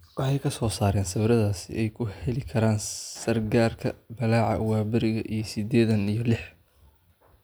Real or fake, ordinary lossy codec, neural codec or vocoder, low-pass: real; none; none; none